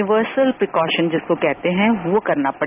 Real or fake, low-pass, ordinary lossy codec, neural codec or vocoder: real; 3.6 kHz; none; none